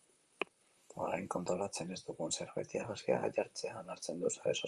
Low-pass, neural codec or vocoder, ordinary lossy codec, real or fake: 10.8 kHz; vocoder, 44.1 kHz, 128 mel bands, Pupu-Vocoder; Opus, 32 kbps; fake